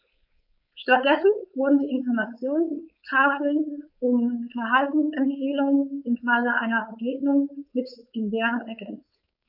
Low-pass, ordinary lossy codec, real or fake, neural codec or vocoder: 5.4 kHz; none; fake; codec, 16 kHz, 4.8 kbps, FACodec